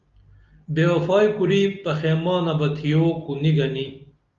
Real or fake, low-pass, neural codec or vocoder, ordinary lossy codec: real; 7.2 kHz; none; Opus, 32 kbps